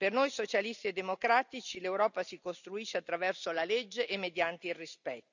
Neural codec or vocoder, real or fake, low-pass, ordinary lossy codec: none; real; 7.2 kHz; none